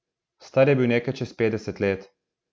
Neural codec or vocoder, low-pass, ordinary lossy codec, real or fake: none; none; none; real